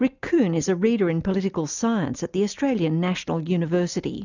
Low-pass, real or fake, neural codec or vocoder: 7.2 kHz; real; none